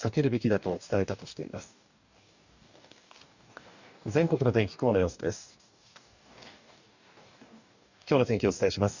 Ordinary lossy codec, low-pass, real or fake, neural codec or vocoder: none; 7.2 kHz; fake; codec, 44.1 kHz, 2.6 kbps, DAC